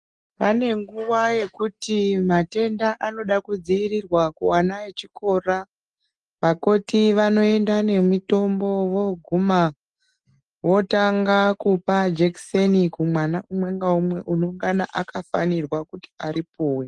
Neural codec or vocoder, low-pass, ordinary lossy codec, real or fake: none; 10.8 kHz; Opus, 24 kbps; real